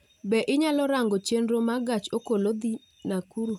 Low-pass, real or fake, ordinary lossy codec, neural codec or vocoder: 19.8 kHz; real; none; none